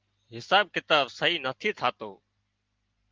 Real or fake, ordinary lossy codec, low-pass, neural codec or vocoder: real; Opus, 32 kbps; 7.2 kHz; none